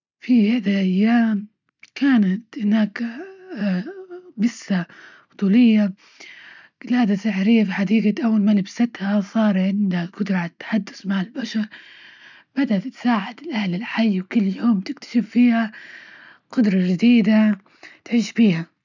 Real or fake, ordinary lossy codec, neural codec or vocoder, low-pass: real; none; none; 7.2 kHz